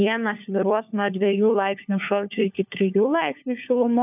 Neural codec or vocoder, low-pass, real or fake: codec, 16 kHz, 4 kbps, FunCodec, trained on LibriTTS, 50 frames a second; 3.6 kHz; fake